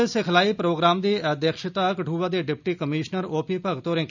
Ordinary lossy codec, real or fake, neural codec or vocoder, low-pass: none; real; none; 7.2 kHz